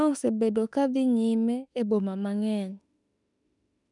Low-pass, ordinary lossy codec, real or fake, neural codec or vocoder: 10.8 kHz; none; fake; autoencoder, 48 kHz, 32 numbers a frame, DAC-VAE, trained on Japanese speech